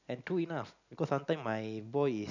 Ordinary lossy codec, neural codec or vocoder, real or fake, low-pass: none; none; real; 7.2 kHz